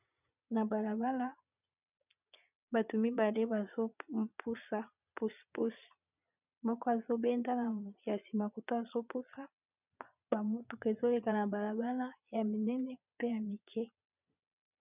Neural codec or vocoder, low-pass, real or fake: vocoder, 22.05 kHz, 80 mel bands, Vocos; 3.6 kHz; fake